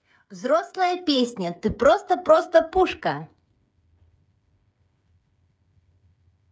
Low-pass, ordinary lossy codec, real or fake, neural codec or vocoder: none; none; fake; codec, 16 kHz, 8 kbps, FreqCodec, smaller model